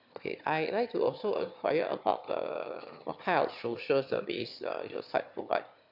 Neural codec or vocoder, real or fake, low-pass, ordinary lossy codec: autoencoder, 22.05 kHz, a latent of 192 numbers a frame, VITS, trained on one speaker; fake; 5.4 kHz; AAC, 48 kbps